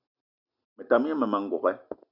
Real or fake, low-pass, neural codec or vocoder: real; 5.4 kHz; none